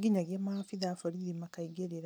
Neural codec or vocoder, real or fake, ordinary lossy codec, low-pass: none; real; none; none